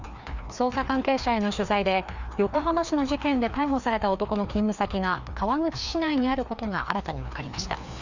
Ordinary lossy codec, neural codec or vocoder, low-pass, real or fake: none; codec, 16 kHz, 2 kbps, FreqCodec, larger model; 7.2 kHz; fake